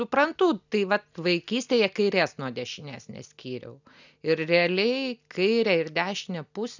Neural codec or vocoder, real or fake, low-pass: none; real; 7.2 kHz